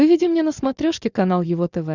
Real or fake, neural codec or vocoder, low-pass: real; none; 7.2 kHz